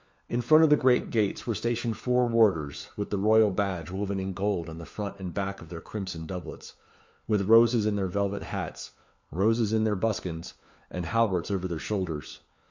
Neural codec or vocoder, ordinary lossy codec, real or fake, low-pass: codec, 16 kHz, 4 kbps, FunCodec, trained on LibriTTS, 50 frames a second; MP3, 48 kbps; fake; 7.2 kHz